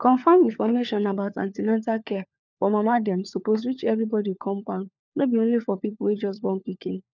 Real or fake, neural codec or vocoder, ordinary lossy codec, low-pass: fake; codec, 16 kHz, 4 kbps, FunCodec, trained on LibriTTS, 50 frames a second; none; 7.2 kHz